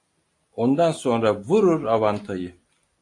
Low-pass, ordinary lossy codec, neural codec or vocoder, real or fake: 10.8 kHz; AAC, 48 kbps; none; real